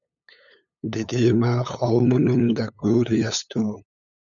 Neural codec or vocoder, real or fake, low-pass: codec, 16 kHz, 8 kbps, FunCodec, trained on LibriTTS, 25 frames a second; fake; 7.2 kHz